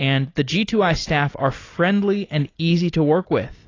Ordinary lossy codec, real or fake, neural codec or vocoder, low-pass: AAC, 32 kbps; real; none; 7.2 kHz